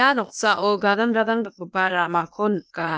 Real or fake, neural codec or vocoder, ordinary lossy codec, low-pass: fake; codec, 16 kHz, 0.8 kbps, ZipCodec; none; none